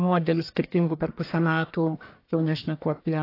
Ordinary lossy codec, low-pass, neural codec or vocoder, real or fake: AAC, 32 kbps; 5.4 kHz; codec, 44.1 kHz, 1.7 kbps, Pupu-Codec; fake